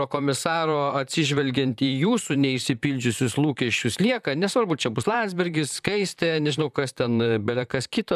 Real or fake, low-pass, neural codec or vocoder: fake; 14.4 kHz; vocoder, 44.1 kHz, 128 mel bands, Pupu-Vocoder